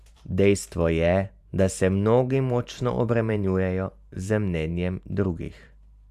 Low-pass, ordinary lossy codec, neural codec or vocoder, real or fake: 14.4 kHz; none; vocoder, 44.1 kHz, 128 mel bands every 512 samples, BigVGAN v2; fake